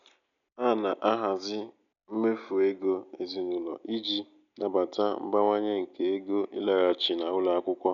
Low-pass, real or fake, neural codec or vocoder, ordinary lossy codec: 7.2 kHz; real; none; none